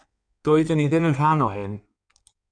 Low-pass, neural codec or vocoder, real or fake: 9.9 kHz; codec, 16 kHz in and 24 kHz out, 1.1 kbps, FireRedTTS-2 codec; fake